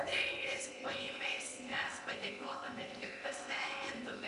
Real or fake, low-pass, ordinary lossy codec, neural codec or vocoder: fake; 10.8 kHz; MP3, 96 kbps; codec, 16 kHz in and 24 kHz out, 0.8 kbps, FocalCodec, streaming, 65536 codes